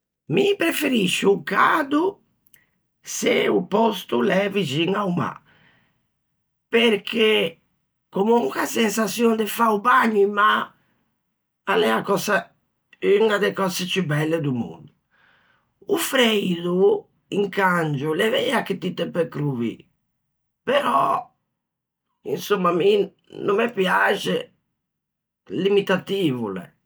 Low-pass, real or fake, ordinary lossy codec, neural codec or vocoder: none; real; none; none